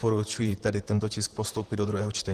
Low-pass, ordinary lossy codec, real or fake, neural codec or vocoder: 14.4 kHz; Opus, 16 kbps; fake; vocoder, 44.1 kHz, 128 mel bands, Pupu-Vocoder